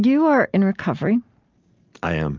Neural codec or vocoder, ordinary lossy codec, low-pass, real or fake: none; Opus, 32 kbps; 7.2 kHz; real